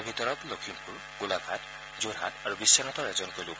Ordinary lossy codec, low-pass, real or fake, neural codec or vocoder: none; none; real; none